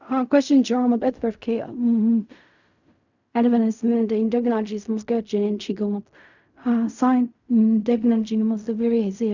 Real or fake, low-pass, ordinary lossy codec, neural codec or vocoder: fake; 7.2 kHz; none; codec, 16 kHz in and 24 kHz out, 0.4 kbps, LongCat-Audio-Codec, fine tuned four codebook decoder